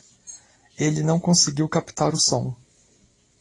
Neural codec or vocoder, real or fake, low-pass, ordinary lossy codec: none; real; 10.8 kHz; AAC, 32 kbps